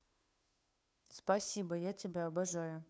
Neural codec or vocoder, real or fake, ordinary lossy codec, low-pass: codec, 16 kHz, 2 kbps, FunCodec, trained on Chinese and English, 25 frames a second; fake; none; none